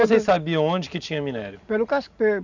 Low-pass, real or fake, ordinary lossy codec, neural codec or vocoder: 7.2 kHz; real; none; none